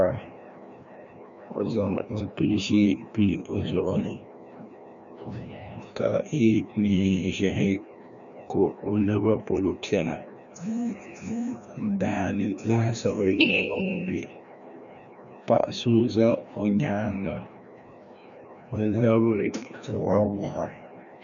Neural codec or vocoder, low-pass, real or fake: codec, 16 kHz, 1 kbps, FreqCodec, larger model; 7.2 kHz; fake